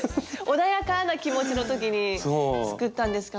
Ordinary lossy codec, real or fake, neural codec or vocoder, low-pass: none; real; none; none